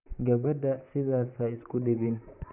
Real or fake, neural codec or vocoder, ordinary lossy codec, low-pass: fake; vocoder, 44.1 kHz, 128 mel bands, Pupu-Vocoder; none; 3.6 kHz